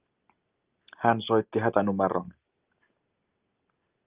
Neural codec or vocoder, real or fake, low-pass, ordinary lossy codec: none; real; 3.6 kHz; Opus, 24 kbps